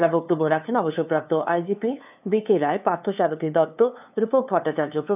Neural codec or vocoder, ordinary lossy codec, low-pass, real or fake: codec, 16 kHz, 2 kbps, FunCodec, trained on LibriTTS, 25 frames a second; none; 3.6 kHz; fake